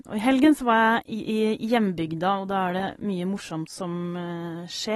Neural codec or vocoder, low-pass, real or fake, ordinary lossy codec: none; 19.8 kHz; real; AAC, 32 kbps